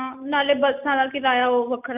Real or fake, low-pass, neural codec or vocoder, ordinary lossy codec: real; 3.6 kHz; none; none